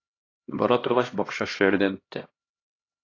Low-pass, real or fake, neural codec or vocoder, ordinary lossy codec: 7.2 kHz; fake; codec, 16 kHz, 1 kbps, X-Codec, HuBERT features, trained on LibriSpeech; AAC, 32 kbps